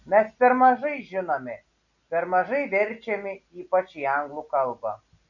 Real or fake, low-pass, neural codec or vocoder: real; 7.2 kHz; none